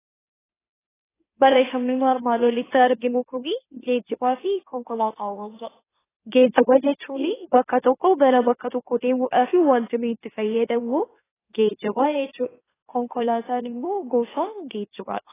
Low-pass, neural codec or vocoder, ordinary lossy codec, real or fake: 3.6 kHz; autoencoder, 44.1 kHz, a latent of 192 numbers a frame, MeloTTS; AAC, 16 kbps; fake